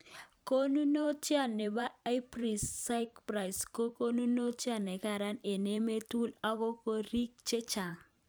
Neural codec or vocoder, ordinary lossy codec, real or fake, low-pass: vocoder, 44.1 kHz, 128 mel bands every 512 samples, BigVGAN v2; none; fake; none